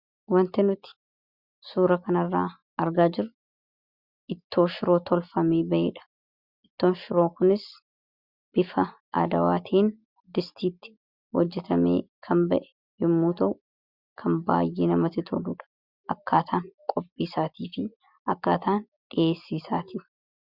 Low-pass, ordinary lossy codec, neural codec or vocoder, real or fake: 5.4 kHz; Opus, 64 kbps; none; real